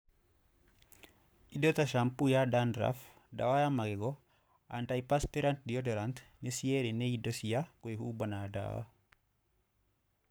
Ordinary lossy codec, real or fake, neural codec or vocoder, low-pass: none; fake; codec, 44.1 kHz, 7.8 kbps, Pupu-Codec; none